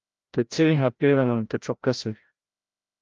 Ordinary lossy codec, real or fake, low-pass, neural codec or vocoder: Opus, 32 kbps; fake; 7.2 kHz; codec, 16 kHz, 0.5 kbps, FreqCodec, larger model